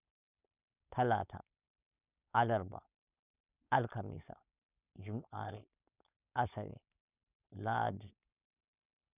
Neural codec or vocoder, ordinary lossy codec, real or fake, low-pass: codec, 16 kHz, 4.8 kbps, FACodec; none; fake; 3.6 kHz